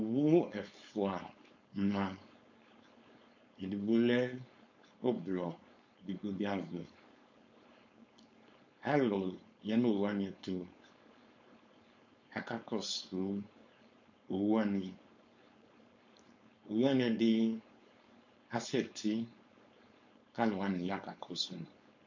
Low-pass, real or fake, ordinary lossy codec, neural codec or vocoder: 7.2 kHz; fake; MP3, 48 kbps; codec, 16 kHz, 4.8 kbps, FACodec